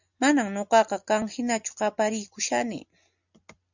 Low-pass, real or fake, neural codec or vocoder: 7.2 kHz; real; none